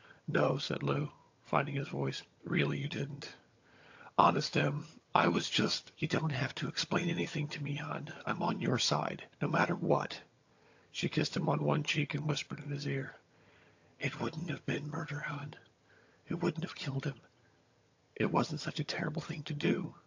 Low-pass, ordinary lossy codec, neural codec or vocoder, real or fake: 7.2 kHz; AAC, 48 kbps; vocoder, 22.05 kHz, 80 mel bands, HiFi-GAN; fake